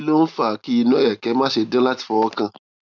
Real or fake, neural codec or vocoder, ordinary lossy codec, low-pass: real; none; none; 7.2 kHz